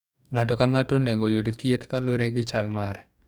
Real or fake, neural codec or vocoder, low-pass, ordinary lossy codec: fake; codec, 44.1 kHz, 2.6 kbps, DAC; 19.8 kHz; none